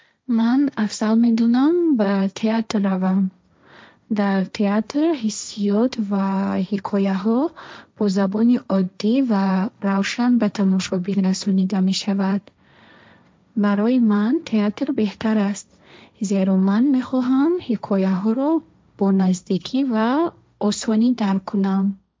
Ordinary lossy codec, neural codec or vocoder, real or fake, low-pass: none; codec, 16 kHz, 1.1 kbps, Voila-Tokenizer; fake; none